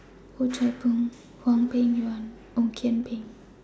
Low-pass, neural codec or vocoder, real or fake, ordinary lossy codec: none; none; real; none